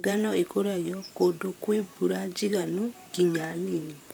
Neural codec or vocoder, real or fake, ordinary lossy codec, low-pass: vocoder, 44.1 kHz, 128 mel bands, Pupu-Vocoder; fake; none; none